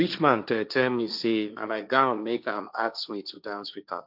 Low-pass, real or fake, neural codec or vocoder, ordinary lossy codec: 5.4 kHz; fake; codec, 16 kHz, 1.1 kbps, Voila-Tokenizer; none